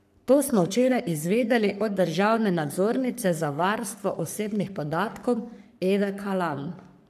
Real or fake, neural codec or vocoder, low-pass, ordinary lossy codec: fake; codec, 44.1 kHz, 3.4 kbps, Pupu-Codec; 14.4 kHz; none